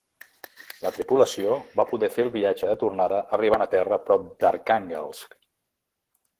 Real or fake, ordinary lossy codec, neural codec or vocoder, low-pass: fake; Opus, 16 kbps; codec, 44.1 kHz, 7.8 kbps, Pupu-Codec; 14.4 kHz